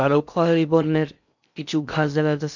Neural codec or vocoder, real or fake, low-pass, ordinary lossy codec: codec, 16 kHz in and 24 kHz out, 0.6 kbps, FocalCodec, streaming, 2048 codes; fake; 7.2 kHz; none